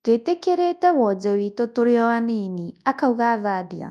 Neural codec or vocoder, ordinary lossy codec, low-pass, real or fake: codec, 24 kHz, 0.9 kbps, WavTokenizer, large speech release; none; none; fake